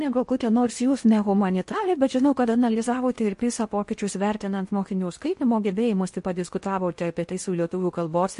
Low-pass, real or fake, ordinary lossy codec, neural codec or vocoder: 10.8 kHz; fake; MP3, 48 kbps; codec, 16 kHz in and 24 kHz out, 0.8 kbps, FocalCodec, streaming, 65536 codes